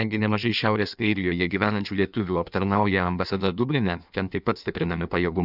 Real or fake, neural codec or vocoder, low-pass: fake; codec, 16 kHz in and 24 kHz out, 1.1 kbps, FireRedTTS-2 codec; 5.4 kHz